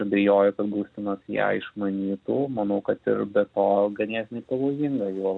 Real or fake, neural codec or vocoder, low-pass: real; none; 9.9 kHz